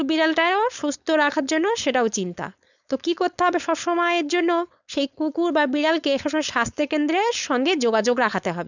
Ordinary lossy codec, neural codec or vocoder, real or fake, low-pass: none; codec, 16 kHz, 4.8 kbps, FACodec; fake; 7.2 kHz